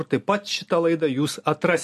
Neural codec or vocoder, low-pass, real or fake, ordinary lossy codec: none; 14.4 kHz; real; MP3, 64 kbps